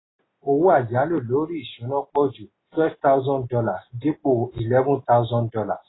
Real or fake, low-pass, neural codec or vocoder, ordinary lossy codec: real; 7.2 kHz; none; AAC, 16 kbps